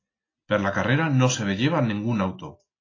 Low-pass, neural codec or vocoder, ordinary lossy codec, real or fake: 7.2 kHz; none; AAC, 32 kbps; real